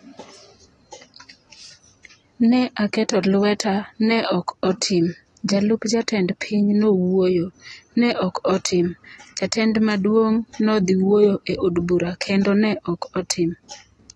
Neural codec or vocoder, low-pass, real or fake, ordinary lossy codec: none; 10.8 kHz; real; AAC, 32 kbps